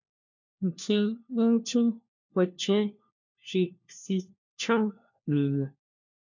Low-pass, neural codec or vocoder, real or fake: 7.2 kHz; codec, 16 kHz, 1 kbps, FunCodec, trained on LibriTTS, 50 frames a second; fake